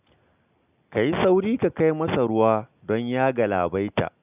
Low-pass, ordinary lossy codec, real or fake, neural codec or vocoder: 3.6 kHz; none; real; none